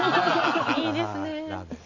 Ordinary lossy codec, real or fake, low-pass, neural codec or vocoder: none; real; 7.2 kHz; none